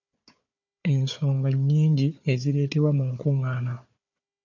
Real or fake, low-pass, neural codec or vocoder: fake; 7.2 kHz; codec, 16 kHz, 4 kbps, FunCodec, trained on Chinese and English, 50 frames a second